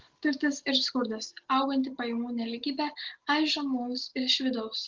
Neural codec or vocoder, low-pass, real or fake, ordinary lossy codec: none; 7.2 kHz; real; Opus, 16 kbps